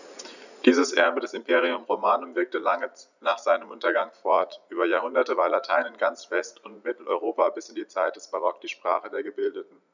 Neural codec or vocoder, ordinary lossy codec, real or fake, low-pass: vocoder, 44.1 kHz, 80 mel bands, Vocos; none; fake; 7.2 kHz